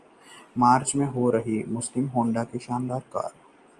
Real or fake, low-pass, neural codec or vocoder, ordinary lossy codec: real; 10.8 kHz; none; Opus, 32 kbps